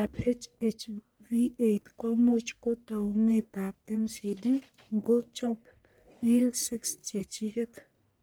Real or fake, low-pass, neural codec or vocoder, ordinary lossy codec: fake; none; codec, 44.1 kHz, 1.7 kbps, Pupu-Codec; none